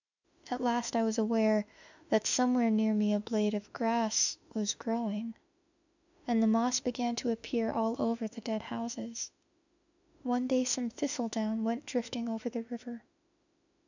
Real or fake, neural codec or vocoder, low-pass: fake; autoencoder, 48 kHz, 32 numbers a frame, DAC-VAE, trained on Japanese speech; 7.2 kHz